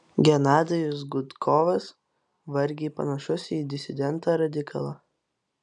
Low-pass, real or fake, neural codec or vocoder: 10.8 kHz; real; none